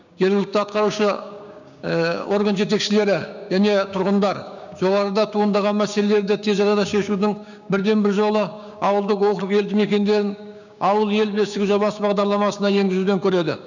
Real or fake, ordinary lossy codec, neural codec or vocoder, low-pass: fake; none; codec, 16 kHz, 6 kbps, DAC; 7.2 kHz